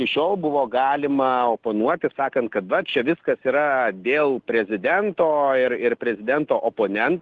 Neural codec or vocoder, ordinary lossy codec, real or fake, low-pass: none; Opus, 16 kbps; real; 10.8 kHz